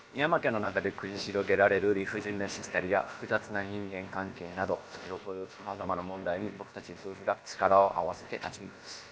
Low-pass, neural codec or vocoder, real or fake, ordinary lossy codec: none; codec, 16 kHz, about 1 kbps, DyCAST, with the encoder's durations; fake; none